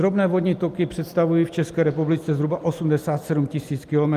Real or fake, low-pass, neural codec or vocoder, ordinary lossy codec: real; 14.4 kHz; none; Opus, 32 kbps